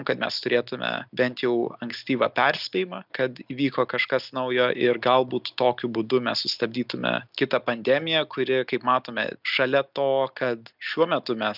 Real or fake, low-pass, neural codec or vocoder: real; 5.4 kHz; none